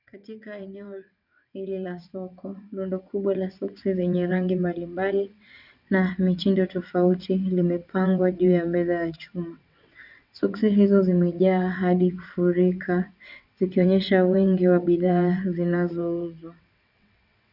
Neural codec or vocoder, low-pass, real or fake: vocoder, 22.05 kHz, 80 mel bands, WaveNeXt; 5.4 kHz; fake